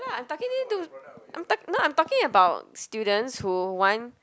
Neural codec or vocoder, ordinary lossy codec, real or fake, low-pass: none; none; real; none